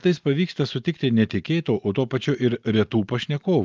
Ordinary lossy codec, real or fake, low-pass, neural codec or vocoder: Opus, 24 kbps; real; 7.2 kHz; none